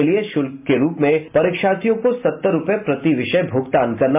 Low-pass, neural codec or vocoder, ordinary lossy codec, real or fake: 3.6 kHz; none; MP3, 32 kbps; real